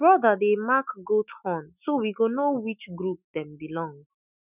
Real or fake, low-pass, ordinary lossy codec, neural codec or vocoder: fake; 3.6 kHz; none; autoencoder, 48 kHz, 128 numbers a frame, DAC-VAE, trained on Japanese speech